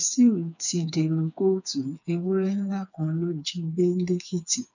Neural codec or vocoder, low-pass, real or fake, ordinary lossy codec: codec, 16 kHz, 4 kbps, FreqCodec, smaller model; 7.2 kHz; fake; none